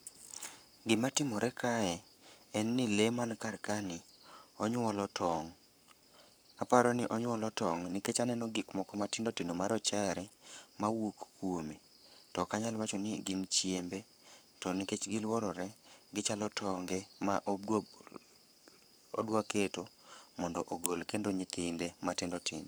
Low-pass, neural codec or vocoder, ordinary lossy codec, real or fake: none; codec, 44.1 kHz, 7.8 kbps, Pupu-Codec; none; fake